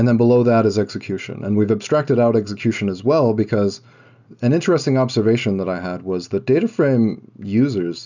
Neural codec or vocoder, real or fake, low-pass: none; real; 7.2 kHz